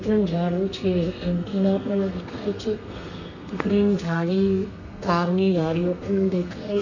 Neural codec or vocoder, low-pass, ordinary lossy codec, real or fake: codec, 32 kHz, 1.9 kbps, SNAC; 7.2 kHz; none; fake